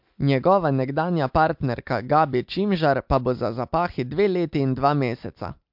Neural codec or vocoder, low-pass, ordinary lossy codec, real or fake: none; 5.4 kHz; MP3, 48 kbps; real